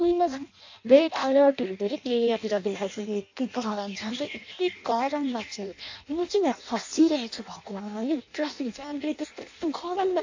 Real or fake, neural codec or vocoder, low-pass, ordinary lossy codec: fake; codec, 16 kHz in and 24 kHz out, 0.6 kbps, FireRedTTS-2 codec; 7.2 kHz; AAC, 48 kbps